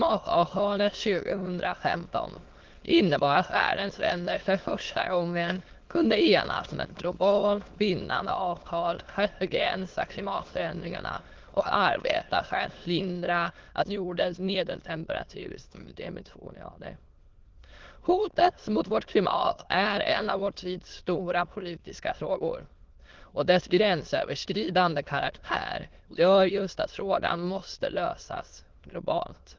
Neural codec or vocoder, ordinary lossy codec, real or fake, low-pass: autoencoder, 22.05 kHz, a latent of 192 numbers a frame, VITS, trained on many speakers; Opus, 16 kbps; fake; 7.2 kHz